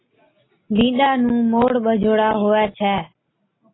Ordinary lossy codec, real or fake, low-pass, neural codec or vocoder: AAC, 16 kbps; real; 7.2 kHz; none